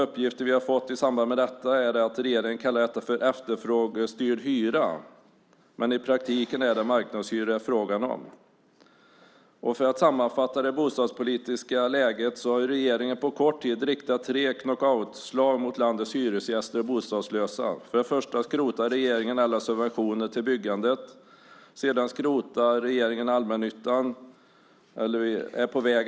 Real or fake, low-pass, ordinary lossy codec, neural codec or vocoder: real; none; none; none